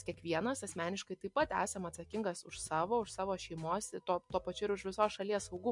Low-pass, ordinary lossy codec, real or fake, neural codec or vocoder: 10.8 kHz; MP3, 64 kbps; real; none